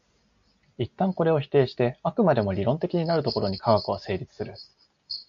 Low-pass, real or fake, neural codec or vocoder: 7.2 kHz; real; none